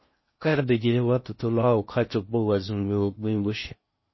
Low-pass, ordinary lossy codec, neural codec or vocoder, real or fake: 7.2 kHz; MP3, 24 kbps; codec, 16 kHz in and 24 kHz out, 0.6 kbps, FocalCodec, streaming, 4096 codes; fake